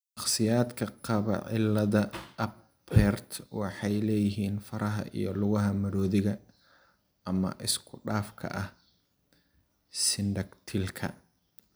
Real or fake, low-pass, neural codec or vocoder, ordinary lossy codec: real; none; none; none